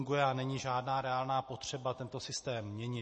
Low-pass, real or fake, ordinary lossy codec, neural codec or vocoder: 10.8 kHz; real; MP3, 32 kbps; none